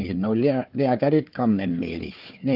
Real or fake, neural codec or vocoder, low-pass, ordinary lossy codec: fake; codec, 16 kHz, 4 kbps, FunCodec, trained on Chinese and English, 50 frames a second; 5.4 kHz; Opus, 32 kbps